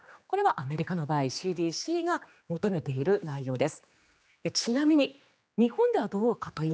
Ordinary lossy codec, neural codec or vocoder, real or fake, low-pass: none; codec, 16 kHz, 2 kbps, X-Codec, HuBERT features, trained on general audio; fake; none